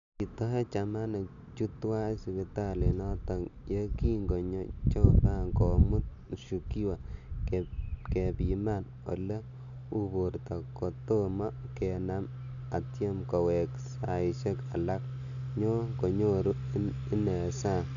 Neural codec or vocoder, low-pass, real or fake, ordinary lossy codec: none; 7.2 kHz; real; none